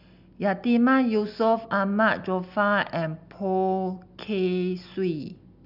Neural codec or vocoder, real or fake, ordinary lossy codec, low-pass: none; real; none; 5.4 kHz